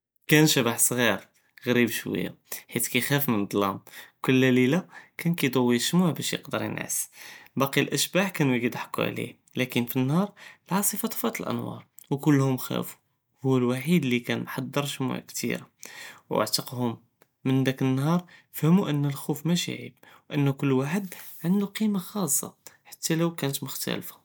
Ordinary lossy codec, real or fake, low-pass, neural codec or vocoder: none; real; none; none